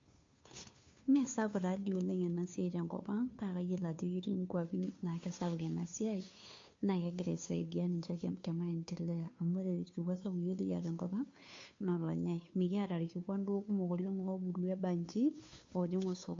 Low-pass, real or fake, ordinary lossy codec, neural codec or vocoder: 7.2 kHz; fake; MP3, 48 kbps; codec, 16 kHz, 2 kbps, FunCodec, trained on Chinese and English, 25 frames a second